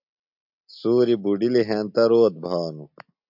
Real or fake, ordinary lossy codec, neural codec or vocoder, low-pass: real; MP3, 48 kbps; none; 5.4 kHz